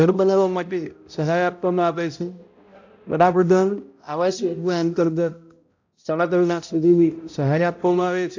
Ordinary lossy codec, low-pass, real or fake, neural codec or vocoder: AAC, 48 kbps; 7.2 kHz; fake; codec, 16 kHz, 0.5 kbps, X-Codec, HuBERT features, trained on balanced general audio